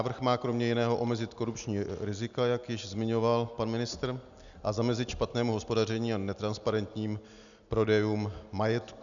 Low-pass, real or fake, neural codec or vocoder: 7.2 kHz; real; none